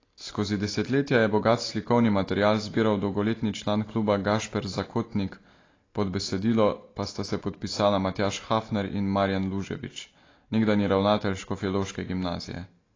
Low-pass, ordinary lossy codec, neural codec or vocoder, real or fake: 7.2 kHz; AAC, 32 kbps; none; real